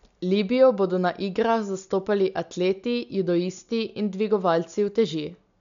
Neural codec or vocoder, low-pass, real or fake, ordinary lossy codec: none; 7.2 kHz; real; MP3, 64 kbps